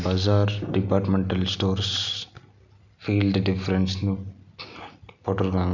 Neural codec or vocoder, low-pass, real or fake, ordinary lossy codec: none; 7.2 kHz; real; none